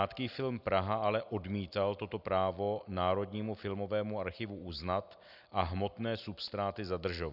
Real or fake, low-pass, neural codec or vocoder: real; 5.4 kHz; none